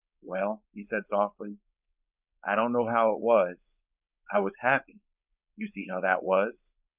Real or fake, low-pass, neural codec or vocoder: fake; 3.6 kHz; codec, 16 kHz, 4.8 kbps, FACodec